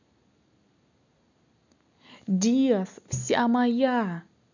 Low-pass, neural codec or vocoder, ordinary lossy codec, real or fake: 7.2 kHz; none; none; real